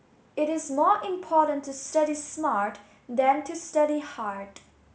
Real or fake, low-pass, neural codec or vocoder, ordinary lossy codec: real; none; none; none